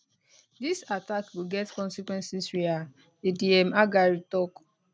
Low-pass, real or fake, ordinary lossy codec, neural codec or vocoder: none; real; none; none